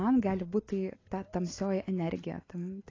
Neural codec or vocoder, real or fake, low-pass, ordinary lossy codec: none; real; 7.2 kHz; AAC, 32 kbps